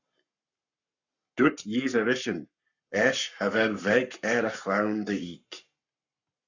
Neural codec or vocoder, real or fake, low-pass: codec, 44.1 kHz, 7.8 kbps, Pupu-Codec; fake; 7.2 kHz